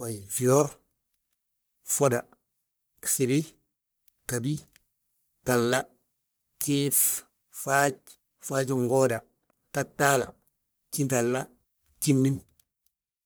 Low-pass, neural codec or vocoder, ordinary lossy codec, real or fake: none; codec, 44.1 kHz, 1.7 kbps, Pupu-Codec; none; fake